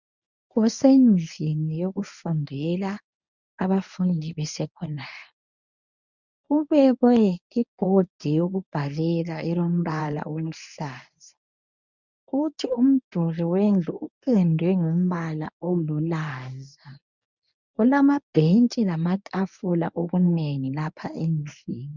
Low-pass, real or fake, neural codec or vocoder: 7.2 kHz; fake; codec, 24 kHz, 0.9 kbps, WavTokenizer, medium speech release version 1